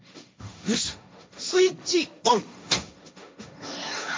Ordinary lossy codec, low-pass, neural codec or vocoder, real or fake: none; none; codec, 16 kHz, 1.1 kbps, Voila-Tokenizer; fake